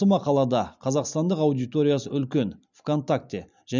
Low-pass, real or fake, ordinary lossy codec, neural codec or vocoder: 7.2 kHz; real; none; none